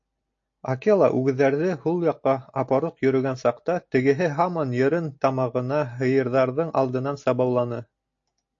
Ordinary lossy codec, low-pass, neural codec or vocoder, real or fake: AAC, 48 kbps; 7.2 kHz; none; real